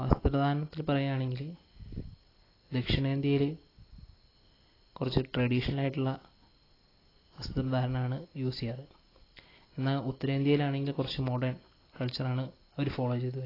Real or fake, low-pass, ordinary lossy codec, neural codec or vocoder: real; 5.4 kHz; AAC, 24 kbps; none